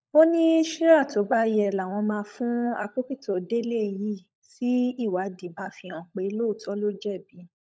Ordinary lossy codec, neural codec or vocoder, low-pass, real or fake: none; codec, 16 kHz, 16 kbps, FunCodec, trained on LibriTTS, 50 frames a second; none; fake